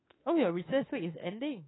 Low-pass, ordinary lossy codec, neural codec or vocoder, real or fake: 7.2 kHz; AAC, 16 kbps; autoencoder, 48 kHz, 32 numbers a frame, DAC-VAE, trained on Japanese speech; fake